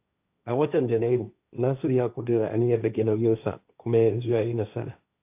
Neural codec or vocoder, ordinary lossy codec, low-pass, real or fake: codec, 16 kHz, 1.1 kbps, Voila-Tokenizer; AAC, 32 kbps; 3.6 kHz; fake